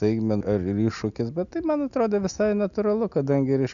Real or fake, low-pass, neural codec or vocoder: real; 7.2 kHz; none